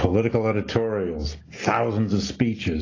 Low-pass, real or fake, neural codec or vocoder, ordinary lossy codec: 7.2 kHz; real; none; AAC, 32 kbps